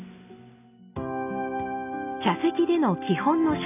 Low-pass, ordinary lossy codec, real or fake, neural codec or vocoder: 3.6 kHz; none; real; none